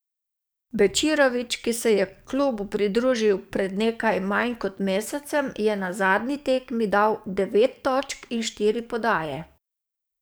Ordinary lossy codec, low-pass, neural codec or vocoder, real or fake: none; none; codec, 44.1 kHz, 7.8 kbps, DAC; fake